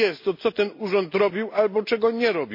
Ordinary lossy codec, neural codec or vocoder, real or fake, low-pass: MP3, 32 kbps; none; real; 5.4 kHz